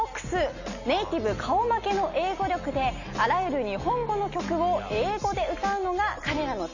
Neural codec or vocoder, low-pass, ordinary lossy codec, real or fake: none; 7.2 kHz; none; real